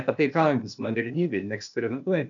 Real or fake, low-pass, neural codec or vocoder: fake; 7.2 kHz; codec, 16 kHz, 0.8 kbps, ZipCodec